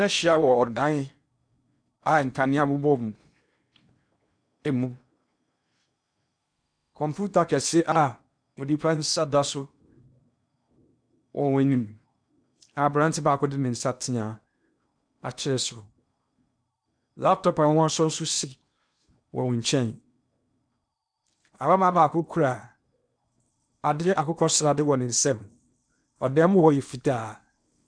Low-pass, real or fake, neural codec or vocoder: 9.9 kHz; fake; codec, 16 kHz in and 24 kHz out, 0.8 kbps, FocalCodec, streaming, 65536 codes